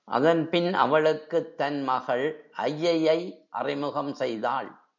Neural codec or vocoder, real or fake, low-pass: none; real; 7.2 kHz